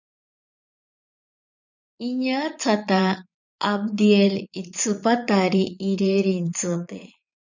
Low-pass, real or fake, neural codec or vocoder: 7.2 kHz; fake; vocoder, 22.05 kHz, 80 mel bands, Vocos